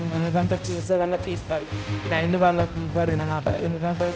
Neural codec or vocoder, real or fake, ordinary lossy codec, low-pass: codec, 16 kHz, 0.5 kbps, X-Codec, HuBERT features, trained on balanced general audio; fake; none; none